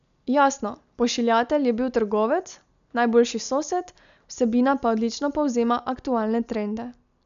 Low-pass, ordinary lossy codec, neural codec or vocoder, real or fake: 7.2 kHz; none; none; real